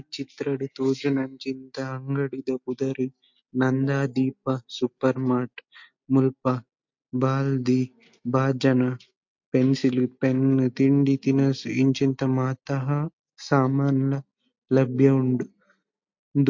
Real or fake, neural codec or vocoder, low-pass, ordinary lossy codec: real; none; 7.2 kHz; MP3, 48 kbps